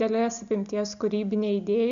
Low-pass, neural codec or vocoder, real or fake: 7.2 kHz; none; real